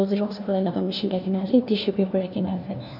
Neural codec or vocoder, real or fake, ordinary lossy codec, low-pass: codec, 16 kHz, 1 kbps, FunCodec, trained on LibriTTS, 50 frames a second; fake; none; 5.4 kHz